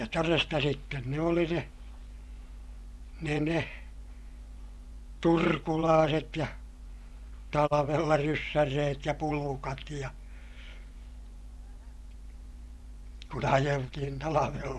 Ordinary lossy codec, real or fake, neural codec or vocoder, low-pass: none; fake; vocoder, 24 kHz, 100 mel bands, Vocos; none